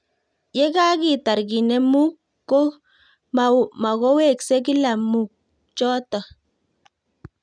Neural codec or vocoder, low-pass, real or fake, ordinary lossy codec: none; 9.9 kHz; real; none